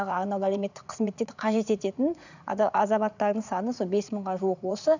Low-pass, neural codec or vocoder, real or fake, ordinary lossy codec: 7.2 kHz; vocoder, 44.1 kHz, 80 mel bands, Vocos; fake; none